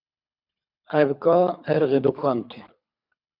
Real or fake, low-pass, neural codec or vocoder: fake; 5.4 kHz; codec, 24 kHz, 3 kbps, HILCodec